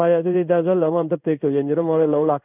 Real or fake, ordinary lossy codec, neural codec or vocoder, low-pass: fake; none; codec, 16 kHz in and 24 kHz out, 1 kbps, XY-Tokenizer; 3.6 kHz